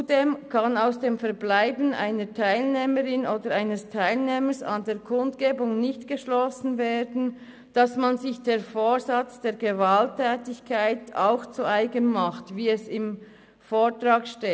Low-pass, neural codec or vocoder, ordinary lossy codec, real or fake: none; none; none; real